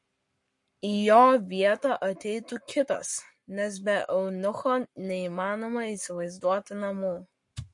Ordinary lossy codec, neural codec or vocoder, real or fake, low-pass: MP3, 48 kbps; codec, 44.1 kHz, 7.8 kbps, Pupu-Codec; fake; 10.8 kHz